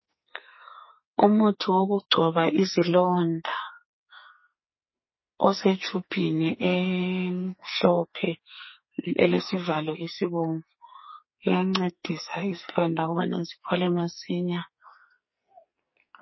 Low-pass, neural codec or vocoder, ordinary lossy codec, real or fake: 7.2 kHz; codec, 44.1 kHz, 2.6 kbps, SNAC; MP3, 24 kbps; fake